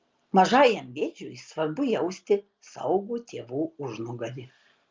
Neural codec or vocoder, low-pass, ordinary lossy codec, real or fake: none; 7.2 kHz; Opus, 24 kbps; real